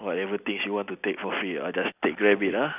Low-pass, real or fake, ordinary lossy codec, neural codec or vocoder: 3.6 kHz; real; none; none